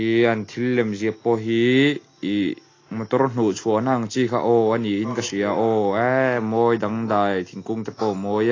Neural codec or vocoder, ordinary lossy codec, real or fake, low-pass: none; AAC, 32 kbps; real; 7.2 kHz